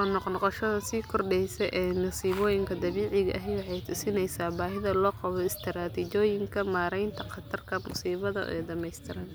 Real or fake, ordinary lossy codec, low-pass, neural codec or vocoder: real; none; none; none